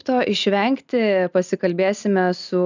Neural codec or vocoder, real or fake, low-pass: none; real; 7.2 kHz